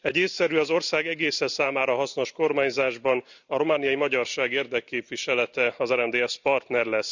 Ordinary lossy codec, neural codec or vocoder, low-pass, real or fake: none; none; 7.2 kHz; real